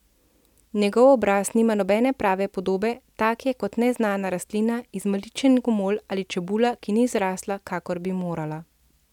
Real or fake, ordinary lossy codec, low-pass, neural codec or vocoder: real; none; 19.8 kHz; none